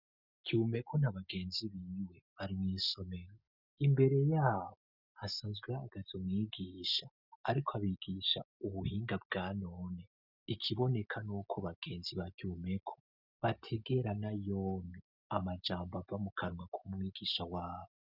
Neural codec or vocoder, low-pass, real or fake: none; 5.4 kHz; real